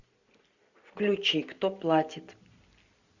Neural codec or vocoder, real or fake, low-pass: none; real; 7.2 kHz